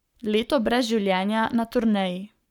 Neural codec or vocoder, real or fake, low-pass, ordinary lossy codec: codec, 44.1 kHz, 7.8 kbps, Pupu-Codec; fake; 19.8 kHz; none